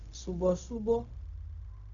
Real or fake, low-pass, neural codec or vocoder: fake; 7.2 kHz; codec, 16 kHz, 0.4 kbps, LongCat-Audio-Codec